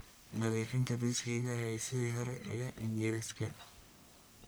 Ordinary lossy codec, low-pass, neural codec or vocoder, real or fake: none; none; codec, 44.1 kHz, 1.7 kbps, Pupu-Codec; fake